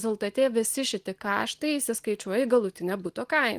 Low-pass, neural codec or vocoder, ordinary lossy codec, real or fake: 14.4 kHz; none; Opus, 24 kbps; real